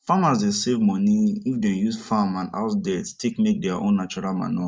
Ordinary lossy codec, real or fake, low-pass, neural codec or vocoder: none; real; none; none